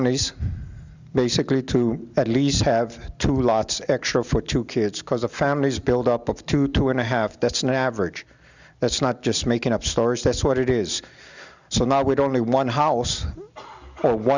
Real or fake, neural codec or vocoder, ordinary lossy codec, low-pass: real; none; Opus, 64 kbps; 7.2 kHz